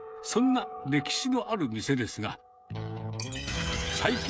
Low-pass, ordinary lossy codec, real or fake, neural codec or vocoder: none; none; fake; codec, 16 kHz, 16 kbps, FreqCodec, smaller model